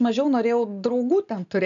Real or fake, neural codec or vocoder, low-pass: real; none; 7.2 kHz